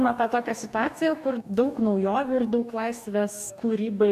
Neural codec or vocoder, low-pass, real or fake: codec, 44.1 kHz, 2.6 kbps, DAC; 14.4 kHz; fake